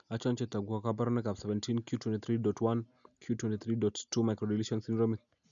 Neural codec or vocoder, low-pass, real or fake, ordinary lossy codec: none; 7.2 kHz; real; none